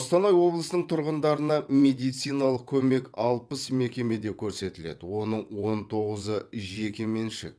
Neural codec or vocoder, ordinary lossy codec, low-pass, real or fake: vocoder, 22.05 kHz, 80 mel bands, WaveNeXt; none; none; fake